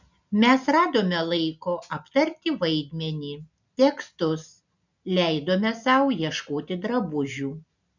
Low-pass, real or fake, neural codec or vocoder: 7.2 kHz; real; none